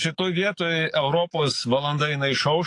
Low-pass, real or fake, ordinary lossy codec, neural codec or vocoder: 10.8 kHz; fake; AAC, 48 kbps; codec, 44.1 kHz, 7.8 kbps, DAC